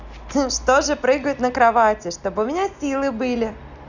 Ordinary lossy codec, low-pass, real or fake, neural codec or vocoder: Opus, 64 kbps; 7.2 kHz; real; none